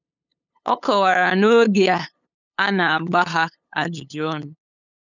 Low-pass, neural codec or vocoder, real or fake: 7.2 kHz; codec, 16 kHz, 8 kbps, FunCodec, trained on LibriTTS, 25 frames a second; fake